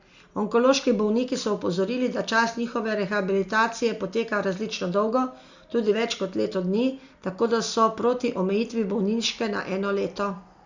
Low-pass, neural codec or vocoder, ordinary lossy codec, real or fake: 7.2 kHz; none; Opus, 64 kbps; real